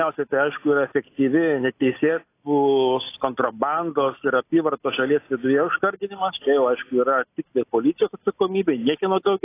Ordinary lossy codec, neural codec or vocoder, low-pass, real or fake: AAC, 24 kbps; none; 3.6 kHz; real